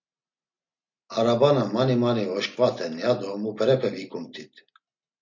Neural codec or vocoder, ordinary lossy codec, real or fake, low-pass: none; MP3, 48 kbps; real; 7.2 kHz